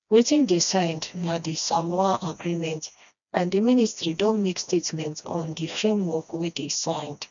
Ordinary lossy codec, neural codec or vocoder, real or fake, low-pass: none; codec, 16 kHz, 1 kbps, FreqCodec, smaller model; fake; 7.2 kHz